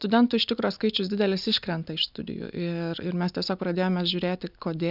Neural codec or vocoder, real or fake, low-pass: none; real; 5.4 kHz